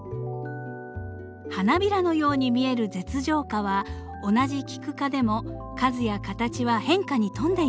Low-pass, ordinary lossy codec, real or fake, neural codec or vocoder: none; none; real; none